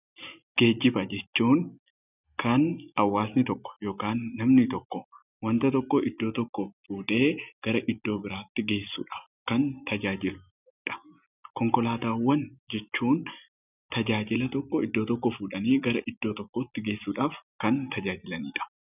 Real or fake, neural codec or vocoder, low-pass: real; none; 3.6 kHz